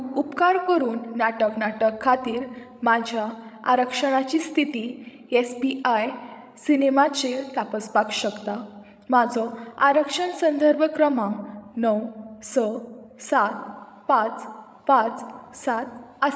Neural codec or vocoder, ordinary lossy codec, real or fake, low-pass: codec, 16 kHz, 16 kbps, FreqCodec, larger model; none; fake; none